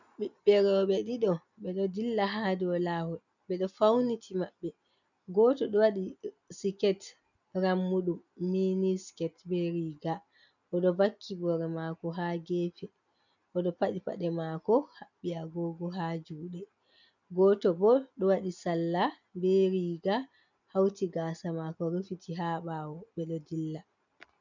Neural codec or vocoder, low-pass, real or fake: none; 7.2 kHz; real